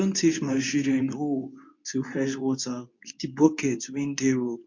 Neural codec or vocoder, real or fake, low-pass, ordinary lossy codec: codec, 24 kHz, 0.9 kbps, WavTokenizer, medium speech release version 2; fake; 7.2 kHz; MP3, 48 kbps